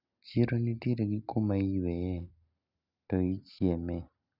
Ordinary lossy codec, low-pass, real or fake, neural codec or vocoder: none; 5.4 kHz; real; none